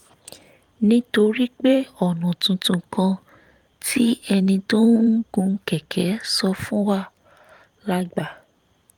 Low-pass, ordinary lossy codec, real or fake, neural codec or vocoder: 19.8 kHz; Opus, 32 kbps; fake; vocoder, 44.1 kHz, 128 mel bands every 512 samples, BigVGAN v2